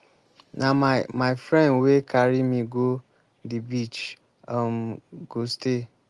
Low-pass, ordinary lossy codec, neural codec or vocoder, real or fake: 10.8 kHz; Opus, 24 kbps; none; real